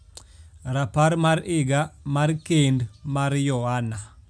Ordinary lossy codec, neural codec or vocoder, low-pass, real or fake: none; none; none; real